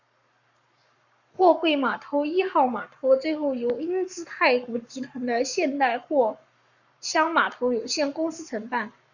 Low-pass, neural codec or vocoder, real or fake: 7.2 kHz; codec, 44.1 kHz, 7.8 kbps, Pupu-Codec; fake